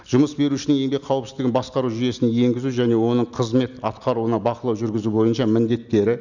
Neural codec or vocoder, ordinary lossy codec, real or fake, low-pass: none; none; real; 7.2 kHz